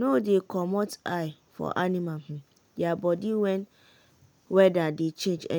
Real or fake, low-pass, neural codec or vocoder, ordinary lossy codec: real; none; none; none